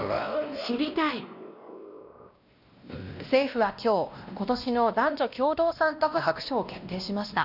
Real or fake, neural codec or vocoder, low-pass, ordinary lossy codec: fake; codec, 16 kHz, 1 kbps, X-Codec, WavLM features, trained on Multilingual LibriSpeech; 5.4 kHz; none